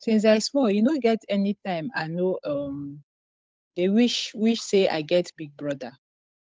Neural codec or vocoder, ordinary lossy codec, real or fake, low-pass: codec, 16 kHz, 8 kbps, FunCodec, trained on Chinese and English, 25 frames a second; none; fake; none